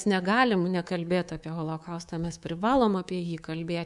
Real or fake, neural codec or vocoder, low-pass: fake; codec, 24 kHz, 3.1 kbps, DualCodec; 10.8 kHz